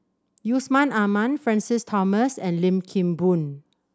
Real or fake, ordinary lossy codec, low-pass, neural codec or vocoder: real; none; none; none